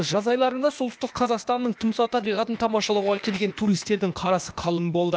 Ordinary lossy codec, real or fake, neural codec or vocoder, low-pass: none; fake; codec, 16 kHz, 0.8 kbps, ZipCodec; none